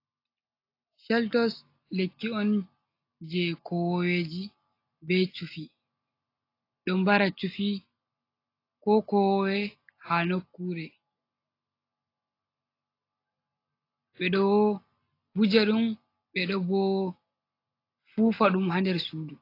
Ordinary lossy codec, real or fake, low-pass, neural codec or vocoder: AAC, 32 kbps; real; 5.4 kHz; none